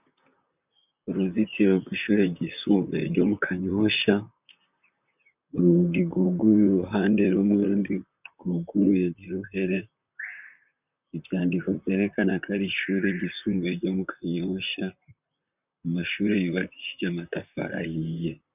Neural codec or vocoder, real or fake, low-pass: vocoder, 44.1 kHz, 128 mel bands, Pupu-Vocoder; fake; 3.6 kHz